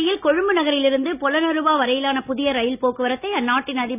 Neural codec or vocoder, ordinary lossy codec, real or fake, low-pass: none; none; real; 3.6 kHz